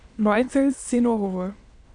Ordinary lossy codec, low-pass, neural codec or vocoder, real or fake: AAC, 64 kbps; 9.9 kHz; autoencoder, 22.05 kHz, a latent of 192 numbers a frame, VITS, trained on many speakers; fake